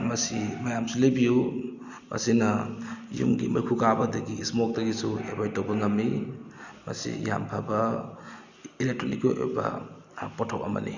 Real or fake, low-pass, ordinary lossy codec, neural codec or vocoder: real; 7.2 kHz; Opus, 64 kbps; none